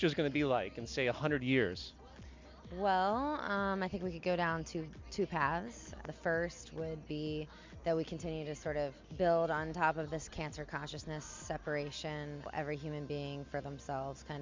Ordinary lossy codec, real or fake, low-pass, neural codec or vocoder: MP3, 64 kbps; real; 7.2 kHz; none